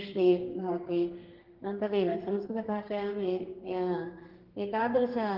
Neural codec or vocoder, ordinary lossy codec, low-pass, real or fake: codec, 32 kHz, 1.9 kbps, SNAC; Opus, 16 kbps; 5.4 kHz; fake